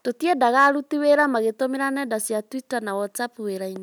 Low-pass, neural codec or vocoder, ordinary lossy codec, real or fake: none; none; none; real